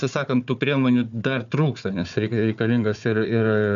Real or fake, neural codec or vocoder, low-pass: fake; codec, 16 kHz, 4 kbps, FunCodec, trained on Chinese and English, 50 frames a second; 7.2 kHz